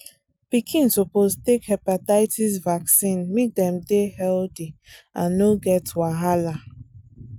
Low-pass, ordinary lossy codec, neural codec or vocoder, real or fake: 19.8 kHz; none; none; real